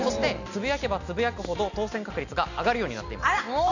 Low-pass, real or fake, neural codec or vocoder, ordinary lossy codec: 7.2 kHz; real; none; none